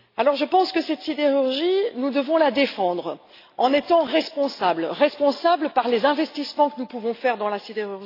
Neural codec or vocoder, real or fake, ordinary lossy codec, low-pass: none; real; AAC, 32 kbps; 5.4 kHz